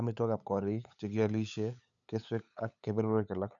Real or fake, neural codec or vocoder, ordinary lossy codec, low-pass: fake; codec, 16 kHz, 8 kbps, FunCodec, trained on LibriTTS, 25 frames a second; none; 7.2 kHz